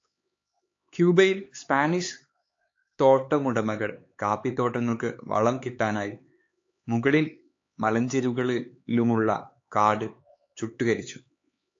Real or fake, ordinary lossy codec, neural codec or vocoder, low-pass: fake; AAC, 48 kbps; codec, 16 kHz, 4 kbps, X-Codec, HuBERT features, trained on LibriSpeech; 7.2 kHz